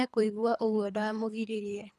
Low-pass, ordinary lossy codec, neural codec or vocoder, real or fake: none; none; codec, 24 kHz, 3 kbps, HILCodec; fake